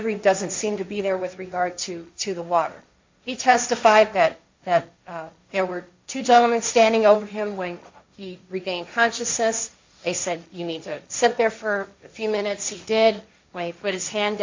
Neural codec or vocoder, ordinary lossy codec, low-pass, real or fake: codec, 16 kHz, 1.1 kbps, Voila-Tokenizer; MP3, 64 kbps; 7.2 kHz; fake